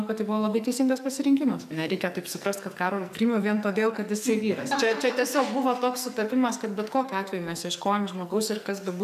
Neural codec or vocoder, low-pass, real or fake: codec, 44.1 kHz, 2.6 kbps, SNAC; 14.4 kHz; fake